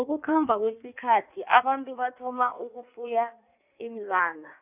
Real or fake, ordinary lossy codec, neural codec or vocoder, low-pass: fake; none; codec, 16 kHz in and 24 kHz out, 1.1 kbps, FireRedTTS-2 codec; 3.6 kHz